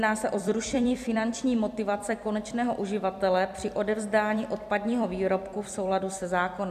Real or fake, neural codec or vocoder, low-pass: fake; vocoder, 44.1 kHz, 128 mel bands every 256 samples, BigVGAN v2; 14.4 kHz